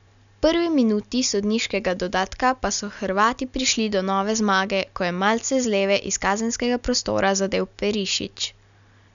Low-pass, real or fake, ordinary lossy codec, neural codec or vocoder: 7.2 kHz; real; none; none